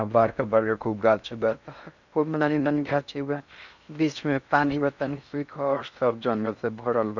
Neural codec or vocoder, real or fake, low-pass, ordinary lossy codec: codec, 16 kHz in and 24 kHz out, 0.6 kbps, FocalCodec, streaming, 4096 codes; fake; 7.2 kHz; none